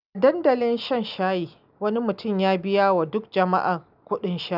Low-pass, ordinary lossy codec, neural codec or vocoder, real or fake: 5.4 kHz; none; none; real